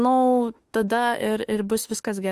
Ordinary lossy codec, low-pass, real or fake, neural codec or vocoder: Opus, 24 kbps; 14.4 kHz; fake; autoencoder, 48 kHz, 32 numbers a frame, DAC-VAE, trained on Japanese speech